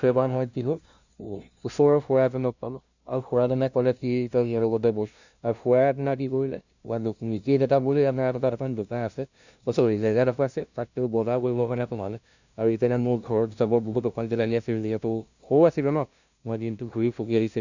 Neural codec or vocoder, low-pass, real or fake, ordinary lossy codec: codec, 16 kHz, 0.5 kbps, FunCodec, trained on LibriTTS, 25 frames a second; 7.2 kHz; fake; none